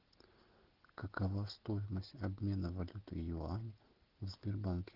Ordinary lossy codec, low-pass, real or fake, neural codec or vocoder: Opus, 16 kbps; 5.4 kHz; real; none